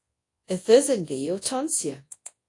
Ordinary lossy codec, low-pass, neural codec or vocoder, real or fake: AAC, 48 kbps; 10.8 kHz; codec, 24 kHz, 0.9 kbps, WavTokenizer, large speech release; fake